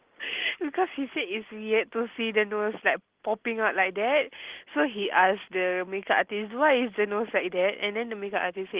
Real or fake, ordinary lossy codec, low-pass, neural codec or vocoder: real; Opus, 16 kbps; 3.6 kHz; none